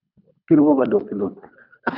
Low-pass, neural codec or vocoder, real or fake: 5.4 kHz; codec, 24 kHz, 3 kbps, HILCodec; fake